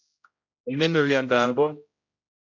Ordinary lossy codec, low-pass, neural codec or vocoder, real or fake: MP3, 48 kbps; 7.2 kHz; codec, 16 kHz, 0.5 kbps, X-Codec, HuBERT features, trained on general audio; fake